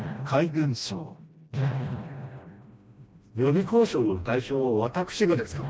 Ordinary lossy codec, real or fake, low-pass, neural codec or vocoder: none; fake; none; codec, 16 kHz, 1 kbps, FreqCodec, smaller model